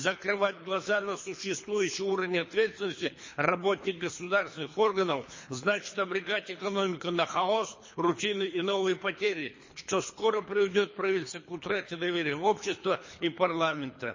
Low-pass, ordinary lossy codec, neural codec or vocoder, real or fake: 7.2 kHz; MP3, 32 kbps; codec, 24 kHz, 3 kbps, HILCodec; fake